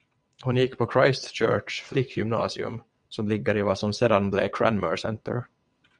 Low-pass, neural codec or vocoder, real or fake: 9.9 kHz; vocoder, 22.05 kHz, 80 mel bands, WaveNeXt; fake